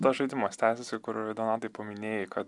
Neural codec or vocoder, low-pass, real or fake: none; 10.8 kHz; real